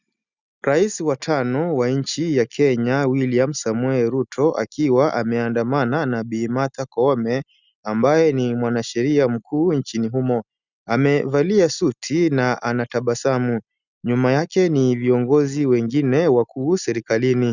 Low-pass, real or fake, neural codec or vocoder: 7.2 kHz; real; none